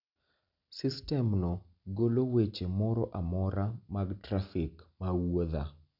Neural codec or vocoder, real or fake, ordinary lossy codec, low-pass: none; real; none; 5.4 kHz